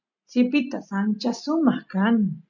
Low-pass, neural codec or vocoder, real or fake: 7.2 kHz; none; real